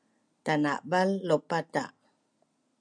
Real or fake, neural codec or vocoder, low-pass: real; none; 9.9 kHz